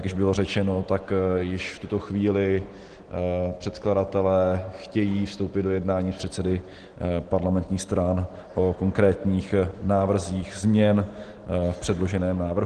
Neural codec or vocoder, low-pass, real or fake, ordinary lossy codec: none; 9.9 kHz; real; Opus, 16 kbps